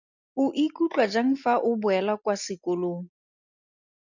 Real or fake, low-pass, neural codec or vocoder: real; 7.2 kHz; none